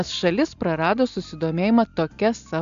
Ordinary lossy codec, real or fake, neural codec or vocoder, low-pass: AAC, 64 kbps; real; none; 7.2 kHz